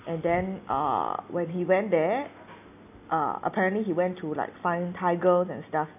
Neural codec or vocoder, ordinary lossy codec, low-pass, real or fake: none; none; 3.6 kHz; real